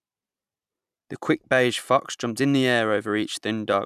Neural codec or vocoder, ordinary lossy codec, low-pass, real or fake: vocoder, 44.1 kHz, 128 mel bands every 256 samples, BigVGAN v2; none; 14.4 kHz; fake